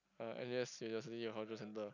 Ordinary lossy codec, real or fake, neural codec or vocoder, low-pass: none; real; none; 7.2 kHz